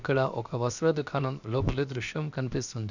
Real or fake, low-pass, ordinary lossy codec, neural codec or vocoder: fake; 7.2 kHz; none; codec, 16 kHz, 0.7 kbps, FocalCodec